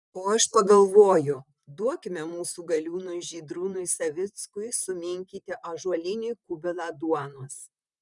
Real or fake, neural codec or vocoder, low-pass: fake; vocoder, 44.1 kHz, 128 mel bands, Pupu-Vocoder; 10.8 kHz